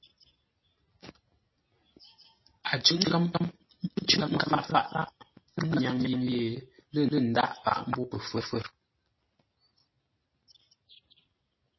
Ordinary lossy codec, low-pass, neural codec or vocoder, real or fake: MP3, 24 kbps; 7.2 kHz; none; real